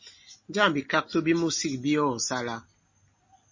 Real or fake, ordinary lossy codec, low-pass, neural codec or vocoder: real; MP3, 32 kbps; 7.2 kHz; none